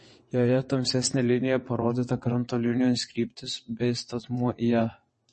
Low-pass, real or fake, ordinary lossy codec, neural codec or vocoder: 9.9 kHz; fake; MP3, 32 kbps; vocoder, 22.05 kHz, 80 mel bands, WaveNeXt